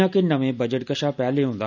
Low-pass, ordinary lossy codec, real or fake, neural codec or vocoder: 7.2 kHz; none; real; none